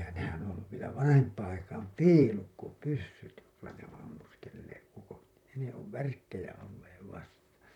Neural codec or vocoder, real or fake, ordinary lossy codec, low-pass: vocoder, 44.1 kHz, 128 mel bands, Pupu-Vocoder; fake; none; 19.8 kHz